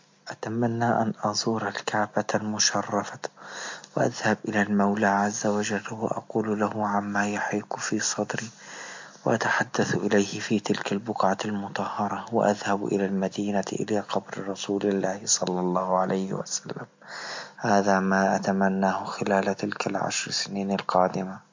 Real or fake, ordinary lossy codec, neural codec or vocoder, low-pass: real; MP3, 48 kbps; none; 7.2 kHz